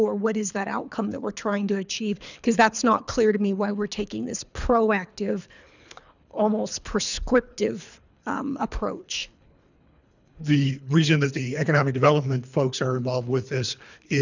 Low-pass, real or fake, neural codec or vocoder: 7.2 kHz; fake; codec, 24 kHz, 3 kbps, HILCodec